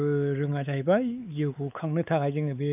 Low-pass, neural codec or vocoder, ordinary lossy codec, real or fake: 3.6 kHz; codec, 16 kHz, 4 kbps, X-Codec, WavLM features, trained on Multilingual LibriSpeech; none; fake